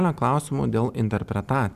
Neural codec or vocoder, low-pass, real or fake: none; 14.4 kHz; real